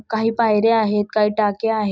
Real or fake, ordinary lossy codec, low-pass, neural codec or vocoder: real; none; none; none